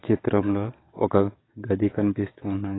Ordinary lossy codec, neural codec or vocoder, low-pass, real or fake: AAC, 16 kbps; codec, 44.1 kHz, 7.8 kbps, DAC; 7.2 kHz; fake